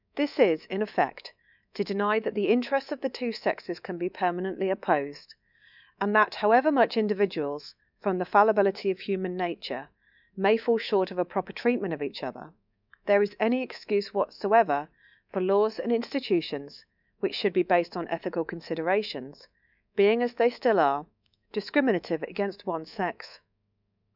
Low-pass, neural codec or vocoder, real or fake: 5.4 kHz; codec, 24 kHz, 3.1 kbps, DualCodec; fake